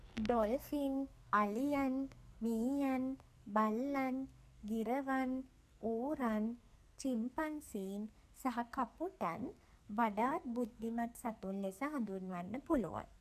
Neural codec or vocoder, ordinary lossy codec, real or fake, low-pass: codec, 44.1 kHz, 2.6 kbps, SNAC; none; fake; 14.4 kHz